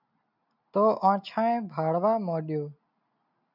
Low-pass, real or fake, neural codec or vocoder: 5.4 kHz; real; none